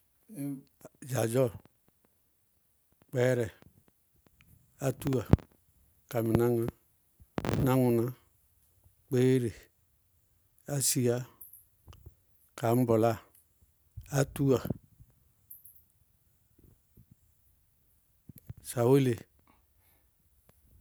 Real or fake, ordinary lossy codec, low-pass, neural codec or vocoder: real; none; none; none